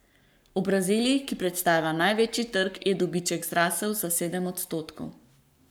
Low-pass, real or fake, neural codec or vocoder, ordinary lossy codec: none; fake; codec, 44.1 kHz, 7.8 kbps, Pupu-Codec; none